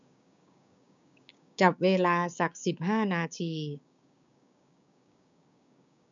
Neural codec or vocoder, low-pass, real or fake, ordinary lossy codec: codec, 16 kHz, 6 kbps, DAC; 7.2 kHz; fake; none